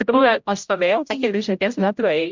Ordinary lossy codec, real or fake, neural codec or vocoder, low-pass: MP3, 64 kbps; fake; codec, 16 kHz, 0.5 kbps, X-Codec, HuBERT features, trained on general audio; 7.2 kHz